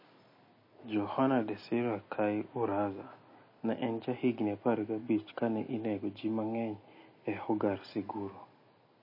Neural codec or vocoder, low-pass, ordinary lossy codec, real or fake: none; 5.4 kHz; MP3, 24 kbps; real